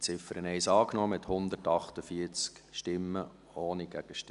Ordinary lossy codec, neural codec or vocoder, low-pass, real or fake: none; none; 10.8 kHz; real